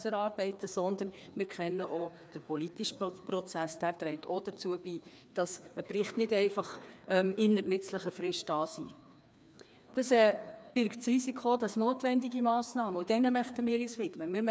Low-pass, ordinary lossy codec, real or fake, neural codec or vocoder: none; none; fake; codec, 16 kHz, 2 kbps, FreqCodec, larger model